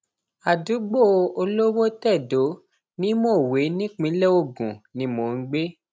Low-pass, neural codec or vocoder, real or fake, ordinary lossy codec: none; none; real; none